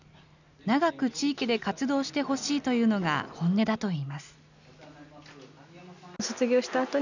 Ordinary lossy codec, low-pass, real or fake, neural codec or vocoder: none; 7.2 kHz; real; none